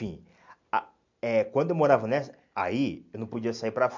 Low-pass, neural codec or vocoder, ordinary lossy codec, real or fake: 7.2 kHz; none; MP3, 64 kbps; real